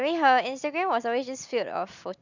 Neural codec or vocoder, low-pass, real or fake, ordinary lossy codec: none; 7.2 kHz; real; none